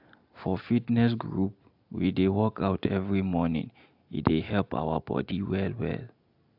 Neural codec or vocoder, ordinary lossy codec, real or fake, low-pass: none; AAC, 48 kbps; real; 5.4 kHz